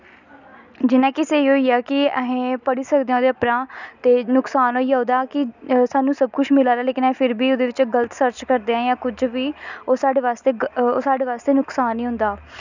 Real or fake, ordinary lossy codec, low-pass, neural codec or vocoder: real; none; 7.2 kHz; none